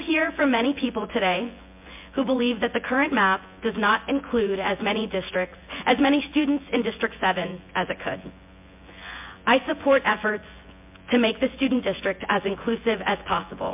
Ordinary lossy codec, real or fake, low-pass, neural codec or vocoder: MP3, 32 kbps; fake; 3.6 kHz; vocoder, 24 kHz, 100 mel bands, Vocos